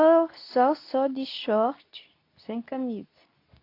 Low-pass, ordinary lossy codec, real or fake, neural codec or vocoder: 5.4 kHz; AAC, 32 kbps; fake; codec, 24 kHz, 0.9 kbps, WavTokenizer, medium speech release version 2